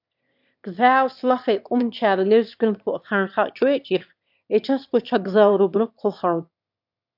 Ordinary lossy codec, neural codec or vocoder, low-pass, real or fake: AAC, 48 kbps; autoencoder, 22.05 kHz, a latent of 192 numbers a frame, VITS, trained on one speaker; 5.4 kHz; fake